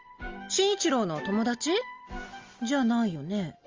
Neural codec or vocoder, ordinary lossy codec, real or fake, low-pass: none; Opus, 32 kbps; real; 7.2 kHz